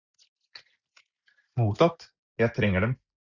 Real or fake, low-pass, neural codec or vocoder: real; 7.2 kHz; none